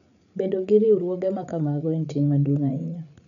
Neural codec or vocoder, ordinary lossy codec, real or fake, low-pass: codec, 16 kHz, 8 kbps, FreqCodec, larger model; none; fake; 7.2 kHz